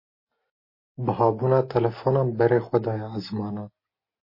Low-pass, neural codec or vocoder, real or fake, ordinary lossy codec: 5.4 kHz; none; real; MP3, 24 kbps